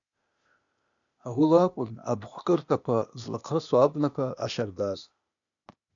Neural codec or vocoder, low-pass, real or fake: codec, 16 kHz, 0.8 kbps, ZipCodec; 7.2 kHz; fake